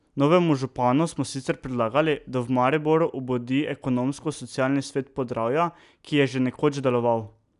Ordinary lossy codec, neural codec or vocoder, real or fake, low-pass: none; none; real; 10.8 kHz